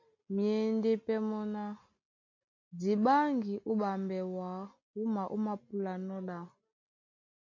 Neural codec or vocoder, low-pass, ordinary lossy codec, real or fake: none; 7.2 kHz; MP3, 48 kbps; real